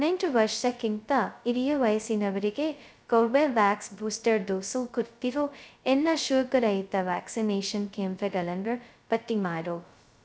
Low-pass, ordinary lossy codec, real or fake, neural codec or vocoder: none; none; fake; codec, 16 kHz, 0.2 kbps, FocalCodec